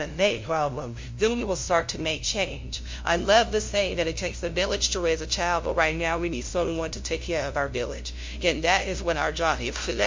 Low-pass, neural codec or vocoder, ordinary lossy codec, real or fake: 7.2 kHz; codec, 16 kHz, 0.5 kbps, FunCodec, trained on LibriTTS, 25 frames a second; MP3, 48 kbps; fake